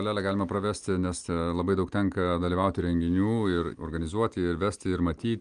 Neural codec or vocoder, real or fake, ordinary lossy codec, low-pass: none; real; Opus, 32 kbps; 9.9 kHz